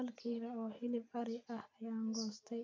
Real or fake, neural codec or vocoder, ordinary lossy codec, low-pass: real; none; MP3, 48 kbps; 7.2 kHz